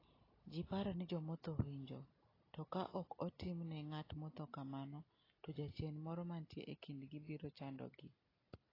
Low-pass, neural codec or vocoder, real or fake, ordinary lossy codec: 5.4 kHz; none; real; AAC, 24 kbps